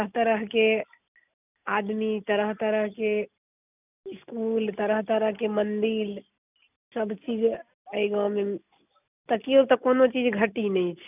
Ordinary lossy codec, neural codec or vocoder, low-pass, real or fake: none; none; 3.6 kHz; real